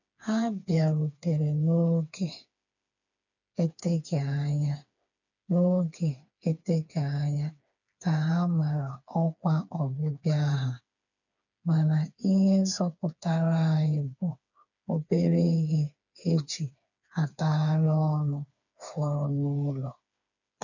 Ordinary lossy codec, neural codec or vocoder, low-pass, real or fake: none; codec, 16 kHz, 4 kbps, FreqCodec, smaller model; 7.2 kHz; fake